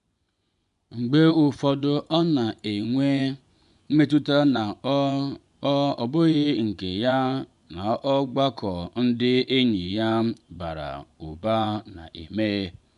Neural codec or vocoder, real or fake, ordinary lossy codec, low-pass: vocoder, 24 kHz, 100 mel bands, Vocos; fake; none; 10.8 kHz